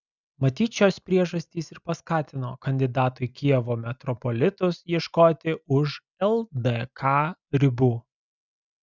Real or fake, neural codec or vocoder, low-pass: real; none; 7.2 kHz